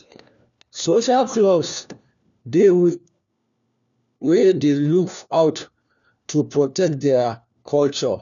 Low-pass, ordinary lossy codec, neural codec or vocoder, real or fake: 7.2 kHz; none; codec, 16 kHz, 1 kbps, FunCodec, trained on LibriTTS, 50 frames a second; fake